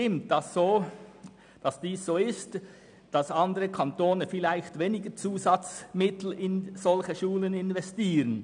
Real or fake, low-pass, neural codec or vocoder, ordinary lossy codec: real; 9.9 kHz; none; none